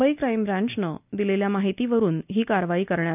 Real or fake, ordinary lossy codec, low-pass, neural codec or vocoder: real; none; 3.6 kHz; none